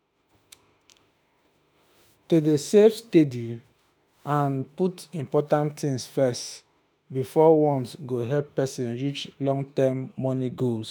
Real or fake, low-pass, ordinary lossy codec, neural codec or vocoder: fake; none; none; autoencoder, 48 kHz, 32 numbers a frame, DAC-VAE, trained on Japanese speech